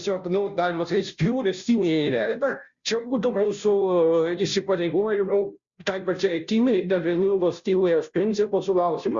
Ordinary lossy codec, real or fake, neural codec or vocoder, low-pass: Opus, 64 kbps; fake; codec, 16 kHz, 0.5 kbps, FunCodec, trained on Chinese and English, 25 frames a second; 7.2 kHz